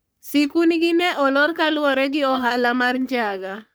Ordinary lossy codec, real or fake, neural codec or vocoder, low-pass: none; fake; codec, 44.1 kHz, 3.4 kbps, Pupu-Codec; none